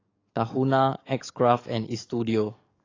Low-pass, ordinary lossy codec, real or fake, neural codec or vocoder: 7.2 kHz; AAC, 32 kbps; fake; codec, 44.1 kHz, 7.8 kbps, DAC